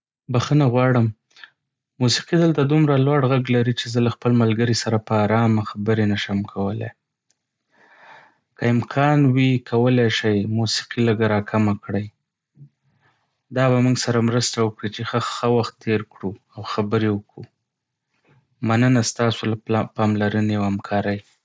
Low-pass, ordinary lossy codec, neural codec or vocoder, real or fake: none; none; none; real